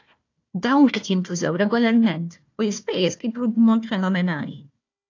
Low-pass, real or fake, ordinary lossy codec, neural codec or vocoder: 7.2 kHz; fake; AAC, 48 kbps; codec, 16 kHz, 1 kbps, FunCodec, trained on Chinese and English, 50 frames a second